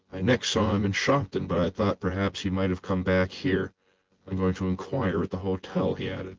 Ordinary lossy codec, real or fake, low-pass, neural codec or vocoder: Opus, 16 kbps; fake; 7.2 kHz; vocoder, 24 kHz, 100 mel bands, Vocos